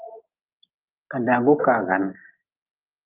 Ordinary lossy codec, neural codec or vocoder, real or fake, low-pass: Opus, 24 kbps; none; real; 3.6 kHz